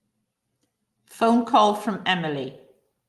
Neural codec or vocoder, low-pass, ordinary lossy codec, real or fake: none; 14.4 kHz; Opus, 32 kbps; real